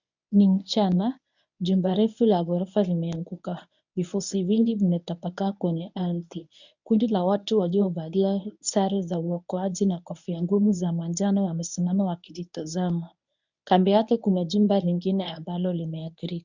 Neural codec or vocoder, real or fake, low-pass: codec, 24 kHz, 0.9 kbps, WavTokenizer, medium speech release version 1; fake; 7.2 kHz